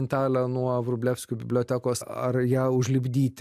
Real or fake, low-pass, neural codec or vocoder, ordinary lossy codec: real; 14.4 kHz; none; AAC, 96 kbps